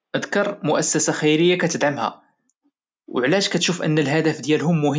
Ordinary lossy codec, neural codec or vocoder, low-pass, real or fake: none; none; none; real